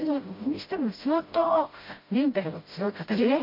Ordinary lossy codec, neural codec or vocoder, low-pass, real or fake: MP3, 32 kbps; codec, 16 kHz, 0.5 kbps, FreqCodec, smaller model; 5.4 kHz; fake